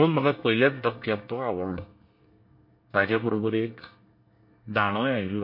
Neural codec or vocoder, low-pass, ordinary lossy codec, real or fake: codec, 24 kHz, 1 kbps, SNAC; 5.4 kHz; MP3, 32 kbps; fake